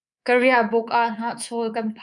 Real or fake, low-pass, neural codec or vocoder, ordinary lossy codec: fake; 10.8 kHz; codec, 24 kHz, 3.1 kbps, DualCodec; MP3, 96 kbps